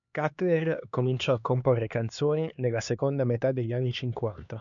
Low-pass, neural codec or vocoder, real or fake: 7.2 kHz; codec, 16 kHz, 2 kbps, X-Codec, HuBERT features, trained on LibriSpeech; fake